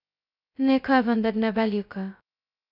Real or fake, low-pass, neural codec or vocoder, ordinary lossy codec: fake; 5.4 kHz; codec, 16 kHz, 0.2 kbps, FocalCodec; none